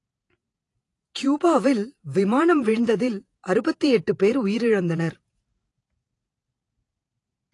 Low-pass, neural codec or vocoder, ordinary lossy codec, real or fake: 10.8 kHz; vocoder, 48 kHz, 128 mel bands, Vocos; AAC, 48 kbps; fake